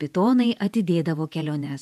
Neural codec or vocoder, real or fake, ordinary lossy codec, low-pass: vocoder, 48 kHz, 128 mel bands, Vocos; fake; AAC, 96 kbps; 14.4 kHz